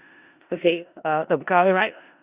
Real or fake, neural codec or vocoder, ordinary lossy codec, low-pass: fake; codec, 16 kHz in and 24 kHz out, 0.4 kbps, LongCat-Audio-Codec, four codebook decoder; Opus, 64 kbps; 3.6 kHz